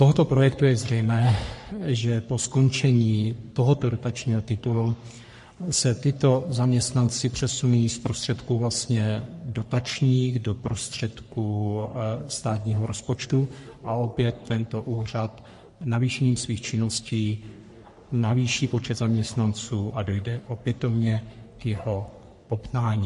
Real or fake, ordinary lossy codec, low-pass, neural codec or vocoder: fake; MP3, 48 kbps; 14.4 kHz; codec, 44.1 kHz, 3.4 kbps, Pupu-Codec